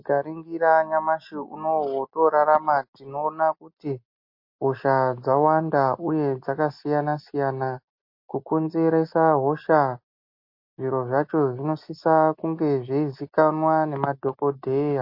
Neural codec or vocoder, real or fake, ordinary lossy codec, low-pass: none; real; MP3, 32 kbps; 5.4 kHz